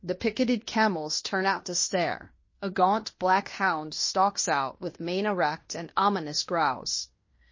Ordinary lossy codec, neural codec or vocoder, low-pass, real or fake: MP3, 32 kbps; codec, 16 kHz in and 24 kHz out, 0.9 kbps, LongCat-Audio-Codec, fine tuned four codebook decoder; 7.2 kHz; fake